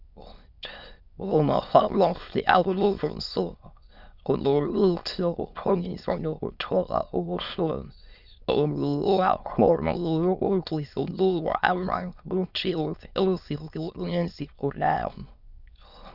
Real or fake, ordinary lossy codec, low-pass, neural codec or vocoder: fake; Opus, 64 kbps; 5.4 kHz; autoencoder, 22.05 kHz, a latent of 192 numbers a frame, VITS, trained on many speakers